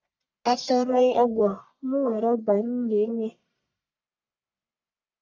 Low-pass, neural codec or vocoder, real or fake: 7.2 kHz; codec, 44.1 kHz, 1.7 kbps, Pupu-Codec; fake